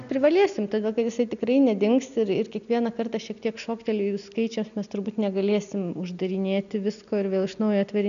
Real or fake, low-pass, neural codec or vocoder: real; 7.2 kHz; none